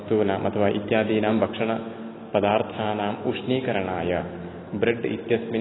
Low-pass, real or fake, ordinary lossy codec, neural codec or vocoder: 7.2 kHz; real; AAC, 16 kbps; none